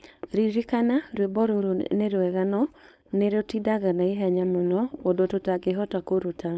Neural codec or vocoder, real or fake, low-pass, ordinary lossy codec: codec, 16 kHz, 4.8 kbps, FACodec; fake; none; none